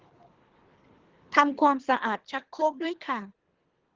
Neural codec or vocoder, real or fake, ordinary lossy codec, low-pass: codec, 24 kHz, 3 kbps, HILCodec; fake; Opus, 16 kbps; 7.2 kHz